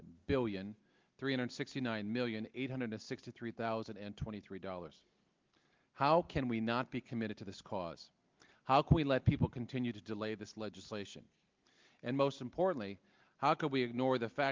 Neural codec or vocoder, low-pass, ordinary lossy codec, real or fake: none; 7.2 kHz; Opus, 32 kbps; real